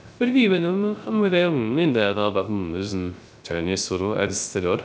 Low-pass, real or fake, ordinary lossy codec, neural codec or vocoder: none; fake; none; codec, 16 kHz, 0.3 kbps, FocalCodec